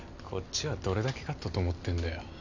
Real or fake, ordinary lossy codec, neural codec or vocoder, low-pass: real; none; none; 7.2 kHz